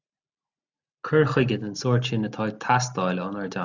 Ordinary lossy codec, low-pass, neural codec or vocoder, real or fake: Opus, 64 kbps; 7.2 kHz; none; real